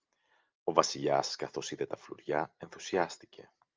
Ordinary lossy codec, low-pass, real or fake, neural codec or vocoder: Opus, 24 kbps; 7.2 kHz; real; none